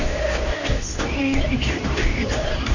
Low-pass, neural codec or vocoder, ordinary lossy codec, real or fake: 7.2 kHz; codec, 24 kHz, 0.9 kbps, WavTokenizer, medium speech release version 2; none; fake